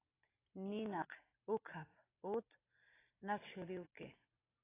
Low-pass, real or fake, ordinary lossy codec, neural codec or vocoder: 3.6 kHz; real; AAC, 16 kbps; none